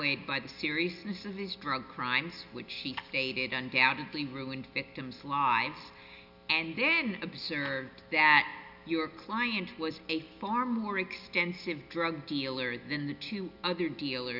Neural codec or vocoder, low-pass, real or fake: none; 5.4 kHz; real